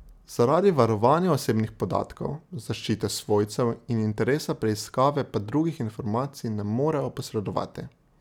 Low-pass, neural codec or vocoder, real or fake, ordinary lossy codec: 19.8 kHz; none; real; none